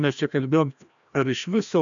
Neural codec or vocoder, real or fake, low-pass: codec, 16 kHz, 1 kbps, FreqCodec, larger model; fake; 7.2 kHz